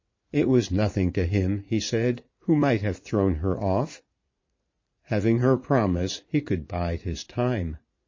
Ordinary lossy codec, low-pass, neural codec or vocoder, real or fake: MP3, 32 kbps; 7.2 kHz; vocoder, 44.1 kHz, 80 mel bands, Vocos; fake